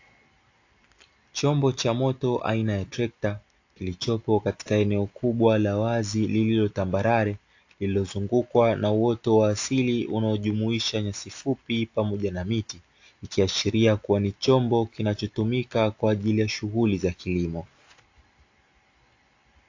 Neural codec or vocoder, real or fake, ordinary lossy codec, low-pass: none; real; AAC, 48 kbps; 7.2 kHz